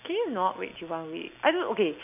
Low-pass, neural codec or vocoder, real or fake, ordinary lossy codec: 3.6 kHz; none; real; none